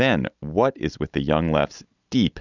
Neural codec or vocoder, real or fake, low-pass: none; real; 7.2 kHz